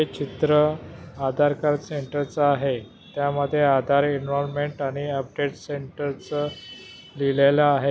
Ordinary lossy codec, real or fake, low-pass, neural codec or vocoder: none; real; none; none